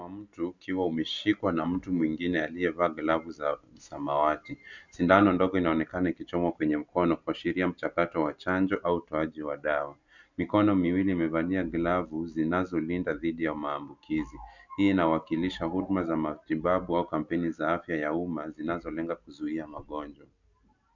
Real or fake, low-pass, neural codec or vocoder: real; 7.2 kHz; none